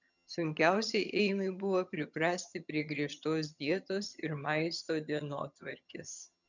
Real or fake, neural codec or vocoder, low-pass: fake; vocoder, 22.05 kHz, 80 mel bands, HiFi-GAN; 7.2 kHz